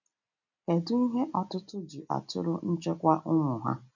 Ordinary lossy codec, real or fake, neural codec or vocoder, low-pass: none; real; none; 7.2 kHz